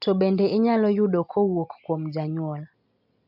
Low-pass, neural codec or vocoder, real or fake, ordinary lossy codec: 5.4 kHz; none; real; none